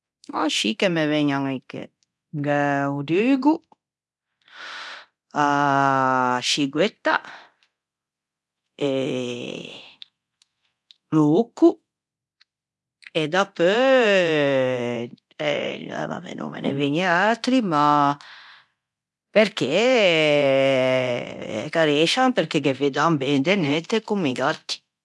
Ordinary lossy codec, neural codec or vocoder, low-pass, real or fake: none; codec, 24 kHz, 0.9 kbps, DualCodec; none; fake